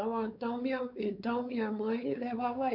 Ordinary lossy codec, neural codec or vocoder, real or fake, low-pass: none; codec, 16 kHz, 4 kbps, X-Codec, WavLM features, trained on Multilingual LibriSpeech; fake; 5.4 kHz